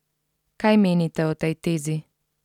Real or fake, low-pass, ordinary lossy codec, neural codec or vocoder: real; 19.8 kHz; none; none